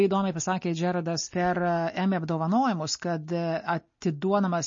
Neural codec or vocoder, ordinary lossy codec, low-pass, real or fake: none; MP3, 32 kbps; 7.2 kHz; real